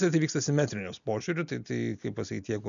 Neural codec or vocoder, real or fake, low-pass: none; real; 7.2 kHz